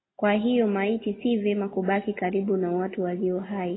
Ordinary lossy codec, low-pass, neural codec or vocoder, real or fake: AAC, 16 kbps; 7.2 kHz; none; real